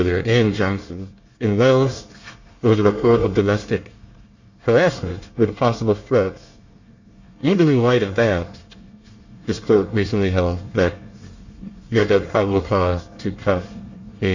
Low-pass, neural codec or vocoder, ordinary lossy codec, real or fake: 7.2 kHz; codec, 24 kHz, 1 kbps, SNAC; Opus, 64 kbps; fake